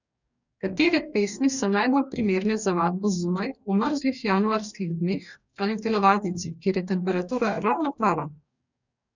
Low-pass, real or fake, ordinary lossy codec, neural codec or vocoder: 7.2 kHz; fake; none; codec, 44.1 kHz, 2.6 kbps, DAC